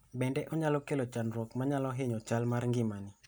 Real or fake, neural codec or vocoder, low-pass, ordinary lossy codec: real; none; none; none